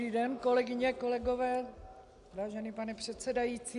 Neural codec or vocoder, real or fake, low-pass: none; real; 10.8 kHz